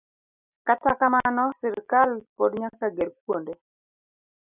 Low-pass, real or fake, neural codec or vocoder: 3.6 kHz; real; none